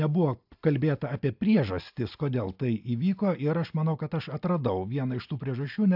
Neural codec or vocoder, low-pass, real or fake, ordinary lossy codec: none; 5.4 kHz; real; AAC, 48 kbps